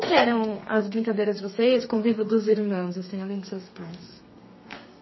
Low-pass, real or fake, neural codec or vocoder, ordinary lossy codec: 7.2 kHz; fake; codec, 32 kHz, 1.9 kbps, SNAC; MP3, 24 kbps